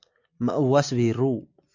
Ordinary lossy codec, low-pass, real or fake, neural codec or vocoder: MP3, 48 kbps; 7.2 kHz; real; none